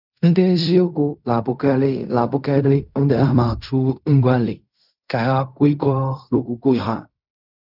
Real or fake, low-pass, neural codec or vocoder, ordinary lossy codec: fake; 5.4 kHz; codec, 16 kHz in and 24 kHz out, 0.4 kbps, LongCat-Audio-Codec, fine tuned four codebook decoder; none